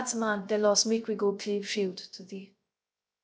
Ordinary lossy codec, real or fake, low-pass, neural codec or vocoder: none; fake; none; codec, 16 kHz, 0.3 kbps, FocalCodec